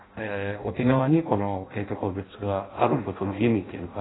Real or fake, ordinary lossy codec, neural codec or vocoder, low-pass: fake; AAC, 16 kbps; codec, 16 kHz in and 24 kHz out, 0.6 kbps, FireRedTTS-2 codec; 7.2 kHz